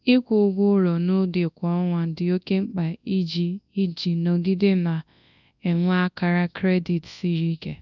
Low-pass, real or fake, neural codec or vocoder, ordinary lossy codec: 7.2 kHz; fake; codec, 24 kHz, 0.9 kbps, WavTokenizer, large speech release; none